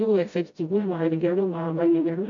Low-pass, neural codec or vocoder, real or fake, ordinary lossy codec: 7.2 kHz; codec, 16 kHz, 0.5 kbps, FreqCodec, smaller model; fake; AAC, 64 kbps